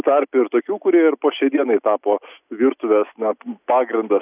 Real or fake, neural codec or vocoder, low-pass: real; none; 3.6 kHz